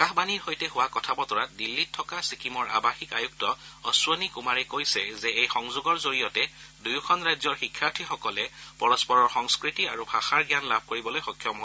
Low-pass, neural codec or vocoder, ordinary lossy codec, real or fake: none; none; none; real